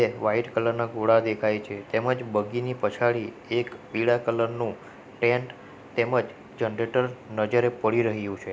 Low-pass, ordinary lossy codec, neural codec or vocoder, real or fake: none; none; none; real